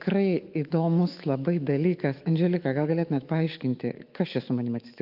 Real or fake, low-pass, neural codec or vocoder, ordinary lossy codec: fake; 5.4 kHz; autoencoder, 48 kHz, 128 numbers a frame, DAC-VAE, trained on Japanese speech; Opus, 32 kbps